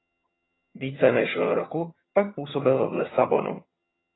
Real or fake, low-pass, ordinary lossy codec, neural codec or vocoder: fake; 7.2 kHz; AAC, 16 kbps; vocoder, 22.05 kHz, 80 mel bands, HiFi-GAN